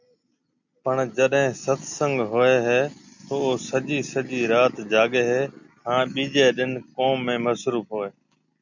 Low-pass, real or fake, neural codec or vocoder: 7.2 kHz; real; none